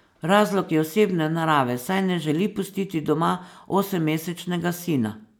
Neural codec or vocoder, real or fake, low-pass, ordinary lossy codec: none; real; none; none